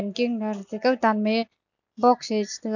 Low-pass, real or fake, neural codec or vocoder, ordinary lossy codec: 7.2 kHz; real; none; none